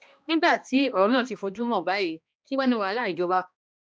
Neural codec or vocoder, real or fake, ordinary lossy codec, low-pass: codec, 16 kHz, 1 kbps, X-Codec, HuBERT features, trained on balanced general audio; fake; none; none